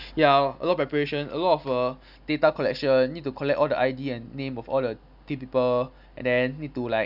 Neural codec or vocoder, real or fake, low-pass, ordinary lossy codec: none; real; 5.4 kHz; none